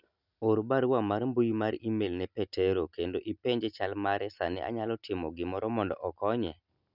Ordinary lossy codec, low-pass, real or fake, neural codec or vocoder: none; 5.4 kHz; real; none